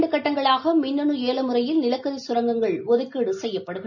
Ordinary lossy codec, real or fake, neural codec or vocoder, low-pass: none; real; none; 7.2 kHz